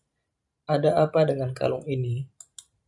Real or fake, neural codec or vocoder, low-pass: fake; vocoder, 44.1 kHz, 128 mel bands every 512 samples, BigVGAN v2; 10.8 kHz